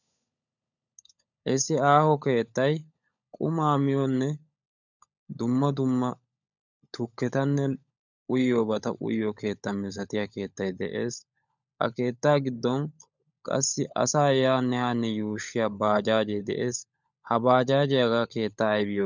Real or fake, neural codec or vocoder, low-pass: fake; codec, 16 kHz, 16 kbps, FunCodec, trained on LibriTTS, 50 frames a second; 7.2 kHz